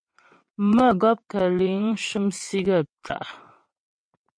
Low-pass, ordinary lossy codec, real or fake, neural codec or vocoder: 9.9 kHz; MP3, 48 kbps; fake; vocoder, 22.05 kHz, 80 mel bands, WaveNeXt